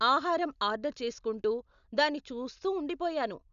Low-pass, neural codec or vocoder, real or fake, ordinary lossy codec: 7.2 kHz; none; real; none